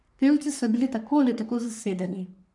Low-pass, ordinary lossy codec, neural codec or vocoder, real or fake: 10.8 kHz; none; codec, 44.1 kHz, 3.4 kbps, Pupu-Codec; fake